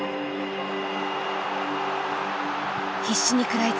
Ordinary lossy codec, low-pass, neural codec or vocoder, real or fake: none; none; none; real